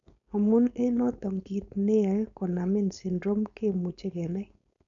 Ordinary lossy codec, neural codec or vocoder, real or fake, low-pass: none; codec, 16 kHz, 4.8 kbps, FACodec; fake; 7.2 kHz